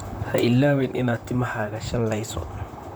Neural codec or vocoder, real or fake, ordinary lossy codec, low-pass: vocoder, 44.1 kHz, 128 mel bands, Pupu-Vocoder; fake; none; none